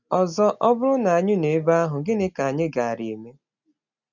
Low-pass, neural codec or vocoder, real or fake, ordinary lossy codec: 7.2 kHz; none; real; none